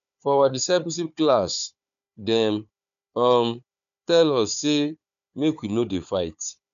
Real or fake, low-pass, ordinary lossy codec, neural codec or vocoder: fake; 7.2 kHz; none; codec, 16 kHz, 4 kbps, FunCodec, trained on Chinese and English, 50 frames a second